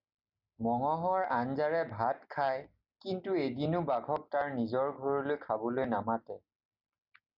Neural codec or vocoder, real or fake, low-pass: none; real; 5.4 kHz